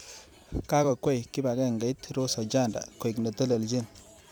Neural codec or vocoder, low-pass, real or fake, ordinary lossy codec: vocoder, 44.1 kHz, 128 mel bands every 256 samples, BigVGAN v2; none; fake; none